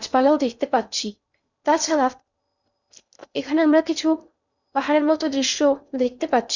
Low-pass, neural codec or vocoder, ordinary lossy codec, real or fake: 7.2 kHz; codec, 16 kHz in and 24 kHz out, 0.6 kbps, FocalCodec, streaming, 2048 codes; none; fake